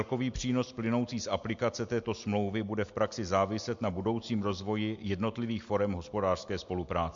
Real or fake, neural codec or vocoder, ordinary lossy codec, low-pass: real; none; MP3, 48 kbps; 7.2 kHz